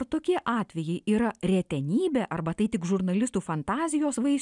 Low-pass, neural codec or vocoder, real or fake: 10.8 kHz; none; real